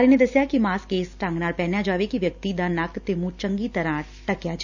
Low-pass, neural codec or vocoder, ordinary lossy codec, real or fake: 7.2 kHz; none; none; real